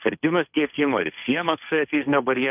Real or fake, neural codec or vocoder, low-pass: fake; codec, 16 kHz, 1.1 kbps, Voila-Tokenizer; 3.6 kHz